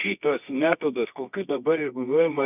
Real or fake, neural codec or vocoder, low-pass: fake; codec, 24 kHz, 0.9 kbps, WavTokenizer, medium music audio release; 3.6 kHz